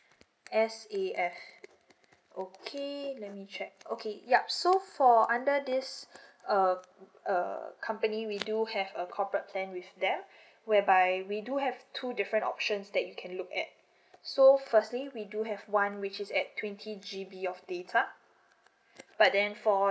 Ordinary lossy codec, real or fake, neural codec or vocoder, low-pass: none; real; none; none